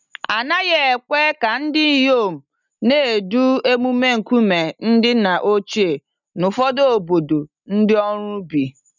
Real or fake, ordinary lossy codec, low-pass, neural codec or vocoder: real; none; 7.2 kHz; none